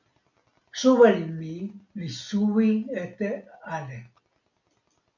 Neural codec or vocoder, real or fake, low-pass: none; real; 7.2 kHz